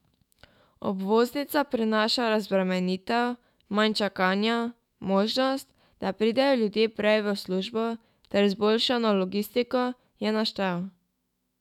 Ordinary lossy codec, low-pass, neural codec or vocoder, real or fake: none; 19.8 kHz; autoencoder, 48 kHz, 128 numbers a frame, DAC-VAE, trained on Japanese speech; fake